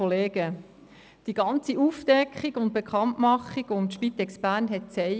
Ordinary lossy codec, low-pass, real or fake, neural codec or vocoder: none; none; real; none